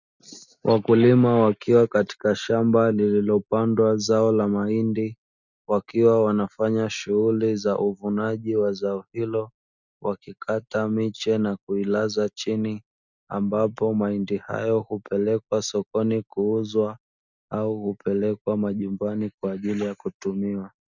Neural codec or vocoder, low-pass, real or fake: none; 7.2 kHz; real